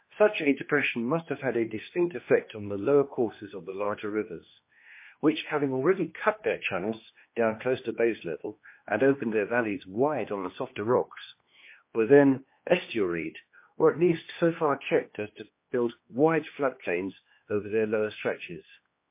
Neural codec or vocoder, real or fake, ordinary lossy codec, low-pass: codec, 16 kHz, 2 kbps, X-Codec, HuBERT features, trained on general audio; fake; MP3, 24 kbps; 3.6 kHz